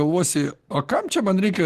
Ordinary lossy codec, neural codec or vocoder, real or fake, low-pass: Opus, 16 kbps; none; real; 14.4 kHz